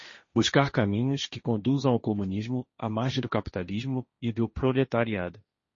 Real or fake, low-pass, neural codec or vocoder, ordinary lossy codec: fake; 7.2 kHz; codec, 16 kHz, 1.1 kbps, Voila-Tokenizer; MP3, 32 kbps